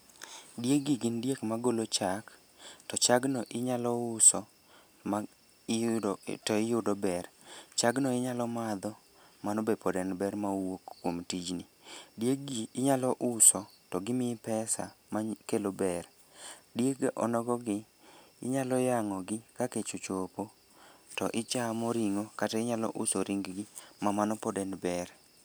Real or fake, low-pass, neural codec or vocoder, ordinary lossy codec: real; none; none; none